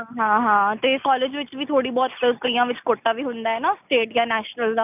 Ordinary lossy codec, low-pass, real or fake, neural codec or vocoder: none; 3.6 kHz; real; none